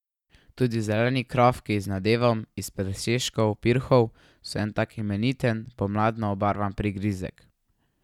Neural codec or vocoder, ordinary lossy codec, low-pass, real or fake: none; none; 19.8 kHz; real